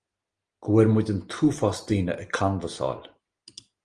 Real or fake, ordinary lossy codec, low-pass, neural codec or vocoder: real; Opus, 24 kbps; 9.9 kHz; none